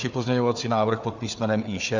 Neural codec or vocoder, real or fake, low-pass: codec, 16 kHz, 4 kbps, FunCodec, trained on Chinese and English, 50 frames a second; fake; 7.2 kHz